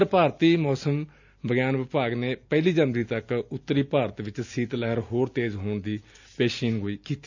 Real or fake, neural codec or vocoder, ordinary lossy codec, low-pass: real; none; none; 7.2 kHz